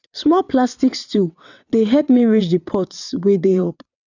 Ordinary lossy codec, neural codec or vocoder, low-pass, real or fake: none; vocoder, 22.05 kHz, 80 mel bands, WaveNeXt; 7.2 kHz; fake